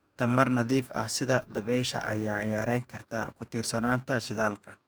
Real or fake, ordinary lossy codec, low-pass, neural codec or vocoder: fake; none; none; codec, 44.1 kHz, 2.6 kbps, DAC